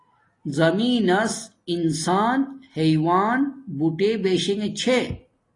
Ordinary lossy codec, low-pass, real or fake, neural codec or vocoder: AAC, 32 kbps; 10.8 kHz; real; none